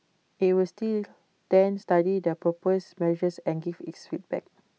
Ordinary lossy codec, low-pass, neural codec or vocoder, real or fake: none; none; none; real